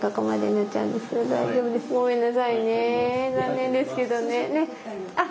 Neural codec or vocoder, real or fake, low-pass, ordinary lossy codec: none; real; none; none